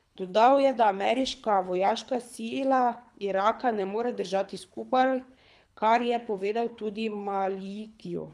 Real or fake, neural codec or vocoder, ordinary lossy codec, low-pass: fake; codec, 24 kHz, 3 kbps, HILCodec; none; none